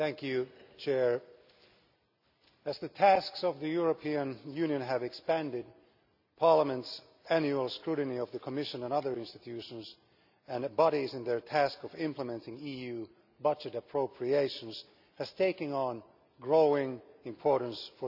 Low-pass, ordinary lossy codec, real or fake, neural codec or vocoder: 5.4 kHz; none; real; none